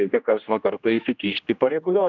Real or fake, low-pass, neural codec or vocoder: fake; 7.2 kHz; codec, 16 kHz, 1 kbps, X-Codec, HuBERT features, trained on general audio